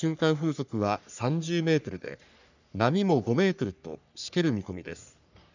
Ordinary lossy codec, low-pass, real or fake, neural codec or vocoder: none; 7.2 kHz; fake; codec, 44.1 kHz, 3.4 kbps, Pupu-Codec